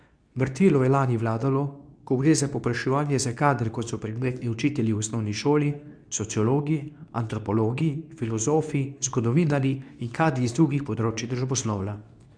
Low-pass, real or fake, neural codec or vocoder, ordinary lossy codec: 9.9 kHz; fake; codec, 24 kHz, 0.9 kbps, WavTokenizer, medium speech release version 2; none